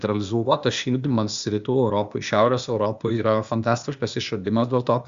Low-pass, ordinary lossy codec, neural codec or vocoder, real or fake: 7.2 kHz; Opus, 64 kbps; codec, 16 kHz, 0.8 kbps, ZipCodec; fake